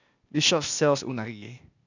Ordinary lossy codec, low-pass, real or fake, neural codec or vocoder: none; 7.2 kHz; fake; codec, 16 kHz, 0.8 kbps, ZipCodec